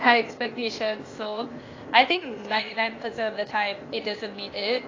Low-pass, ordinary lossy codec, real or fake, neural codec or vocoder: 7.2 kHz; AAC, 32 kbps; fake; codec, 16 kHz, 0.8 kbps, ZipCodec